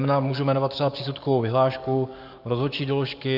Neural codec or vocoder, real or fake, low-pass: codec, 44.1 kHz, 7.8 kbps, DAC; fake; 5.4 kHz